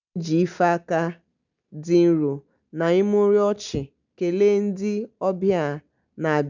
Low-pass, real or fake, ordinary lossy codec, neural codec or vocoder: 7.2 kHz; real; none; none